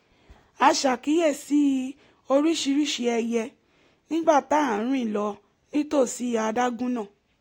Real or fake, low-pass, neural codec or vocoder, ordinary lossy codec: real; 19.8 kHz; none; AAC, 48 kbps